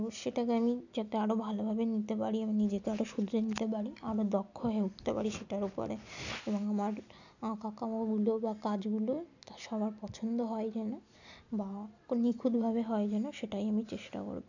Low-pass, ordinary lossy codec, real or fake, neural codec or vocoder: 7.2 kHz; none; real; none